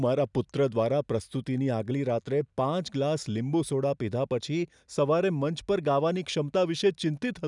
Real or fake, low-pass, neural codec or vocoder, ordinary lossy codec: real; 10.8 kHz; none; none